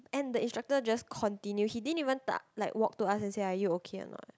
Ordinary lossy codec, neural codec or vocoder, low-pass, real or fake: none; none; none; real